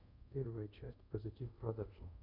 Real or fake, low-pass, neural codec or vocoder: fake; 5.4 kHz; codec, 24 kHz, 0.5 kbps, DualCodec